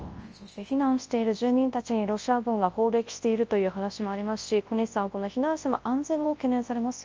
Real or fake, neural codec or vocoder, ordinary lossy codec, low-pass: fake; codec, 24 kHz, 0.9 kbps, WavTokenizer, large speech release; Opus, 24 kbps; 7.2 kHz